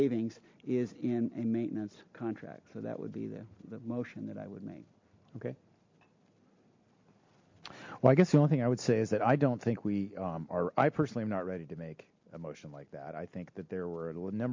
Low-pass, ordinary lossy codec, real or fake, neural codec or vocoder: 7.2 kHz; MP3, 48 kbps; real; none